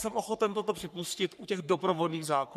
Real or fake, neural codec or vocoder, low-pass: fake; codec, 44.1 kHz, 3.4 kbps, Pupu-Codec; 14.4 kHz